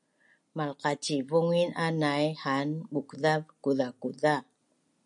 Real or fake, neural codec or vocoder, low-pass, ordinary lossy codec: real; none; 10.8 kHz; MP3, 96 kbps